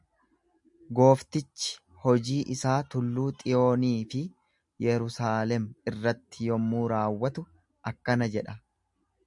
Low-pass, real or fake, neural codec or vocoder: 10.8 kHz; real; none